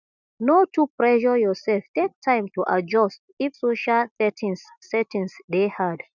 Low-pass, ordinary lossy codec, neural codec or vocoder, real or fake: 7.2 kHz; none; none; real